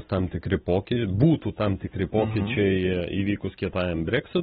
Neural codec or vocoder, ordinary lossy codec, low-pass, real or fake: none; AAC, 16 kbps; 19.8 kHz; real